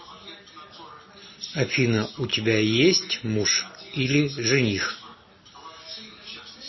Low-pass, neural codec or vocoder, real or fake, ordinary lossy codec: 7.2 kHz; none; real; MP3, 24 kbps